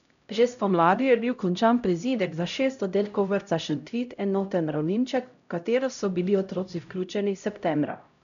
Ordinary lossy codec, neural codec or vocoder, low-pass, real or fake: MP3, 96 kbps; codec, 16 kHz, 0.5 kbps, X-Codec, HuBERT features, trained on LibriSpeech; 7.2 kHz; fake